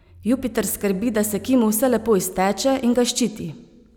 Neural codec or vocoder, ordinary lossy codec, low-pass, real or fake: none; none; none; real